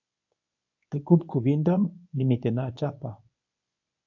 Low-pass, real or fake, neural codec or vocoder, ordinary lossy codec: 7.2 kHz; fake; codec, 24 kHz, 0.9 kbps, WavTokenizer, medium speech release version 1; AAC, 48 kbps